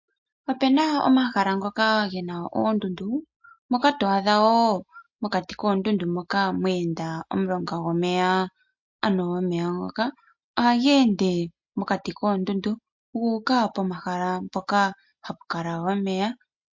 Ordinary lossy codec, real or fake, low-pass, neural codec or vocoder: MP3, 48 kbps; real; 7.2 kHz; none